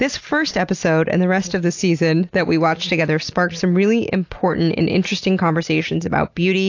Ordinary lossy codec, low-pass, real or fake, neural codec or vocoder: AAC, 48 kbps; 7.2 kHz; real; none